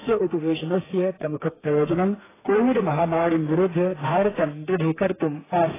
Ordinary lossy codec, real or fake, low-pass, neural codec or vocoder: AAC, 16 kbps; fake; 3.6 kHz; codec, 32 kHz, 1.9 kbps, SNAC